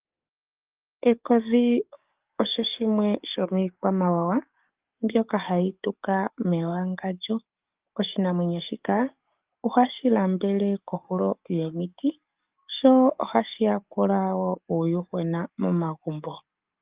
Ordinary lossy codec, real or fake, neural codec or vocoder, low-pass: Opus, 32 kbps; fake; codec, 44.1 kHz, 7.8 kbps, DAC; 3.6 kHz